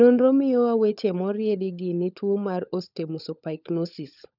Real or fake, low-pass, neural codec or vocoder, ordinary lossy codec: fake; 5.4 kHz; codec, 16 kHz, 16 kbps, FunCodec, trained on LibriTTS, 50 frames a second; none